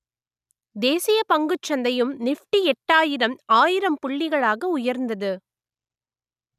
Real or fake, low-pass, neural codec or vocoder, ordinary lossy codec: real; 14.4 kHz; none; none